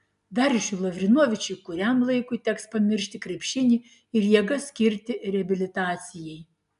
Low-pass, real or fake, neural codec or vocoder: 10.8 kHz; real; none